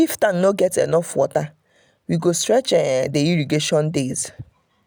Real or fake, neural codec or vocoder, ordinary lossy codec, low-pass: real; none; none; none